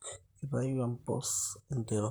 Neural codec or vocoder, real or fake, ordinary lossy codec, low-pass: vocoder, 44.1 kHz, 128 mel bands, Pupu-Vocoder; fake; none; none